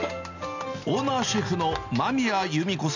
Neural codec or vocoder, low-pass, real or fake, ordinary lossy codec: none; 7.2 kHz; real; none